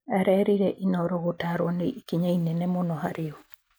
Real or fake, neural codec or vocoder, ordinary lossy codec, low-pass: real; none; none; none